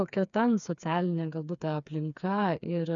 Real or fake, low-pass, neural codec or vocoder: fake; 7.2 kHz; codec, 16 kHz, 4 kbps, FreqCodec, smaller model